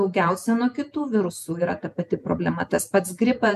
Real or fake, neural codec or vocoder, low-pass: fake; vocoder, 48 kHz, 128 mel bands, Vocos; 14.4 kHz